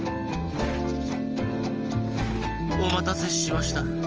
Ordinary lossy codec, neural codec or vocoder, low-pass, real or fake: Opus, 24 kbps; none; 7.2 kHz; real